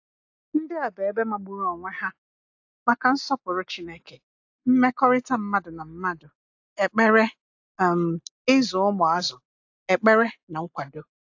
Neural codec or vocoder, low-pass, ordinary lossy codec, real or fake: none; 7.2 kHz; AAC, 48 kbps; real